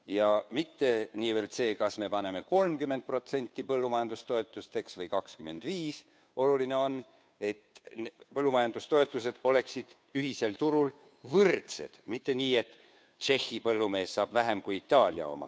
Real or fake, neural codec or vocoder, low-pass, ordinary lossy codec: fake; codec, 16 kHz, 2 kbps, FunCodec, trained on Chinese and English, 25 frames a second; none; none